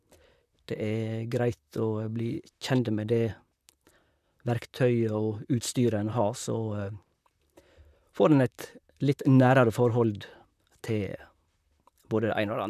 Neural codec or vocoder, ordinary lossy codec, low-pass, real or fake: vocoder, 44.1 kHz, 128 mel bands, Pupu-Vocoder; none; 14.4 kHz; fake